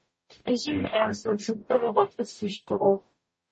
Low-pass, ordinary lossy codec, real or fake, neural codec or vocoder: 10.8 kHz; MP3, 32 kbps; fake; codec, 44.1 kHz, 0.9 kbps, DAC